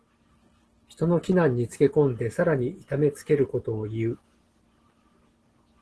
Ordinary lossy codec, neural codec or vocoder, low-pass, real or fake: Opus, 16 kbps; none; 10.8 kHz; real